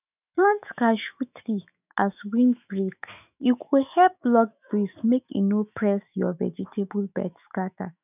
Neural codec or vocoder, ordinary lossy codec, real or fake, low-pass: autoencoder, 48 kHz, 128 numbers a frame, DAC-VAE, trained on Japanese speech; none; fake; 3.6 kHz